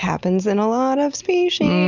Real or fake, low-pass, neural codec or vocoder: real; 7.2 kHz; none